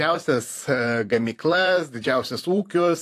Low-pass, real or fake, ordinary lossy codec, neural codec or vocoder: 14.4 kHz; fake; AAC, 64 kbps; vocoder, 44.1 kHz, 128 mel bands, Pupu-Vocoder